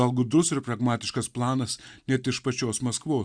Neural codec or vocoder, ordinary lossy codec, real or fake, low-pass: none; Opus, 64 kbps; real; 9.9 kHz